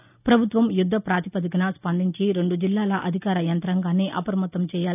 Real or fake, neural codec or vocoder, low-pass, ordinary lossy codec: real; none; 3.6 kHz; none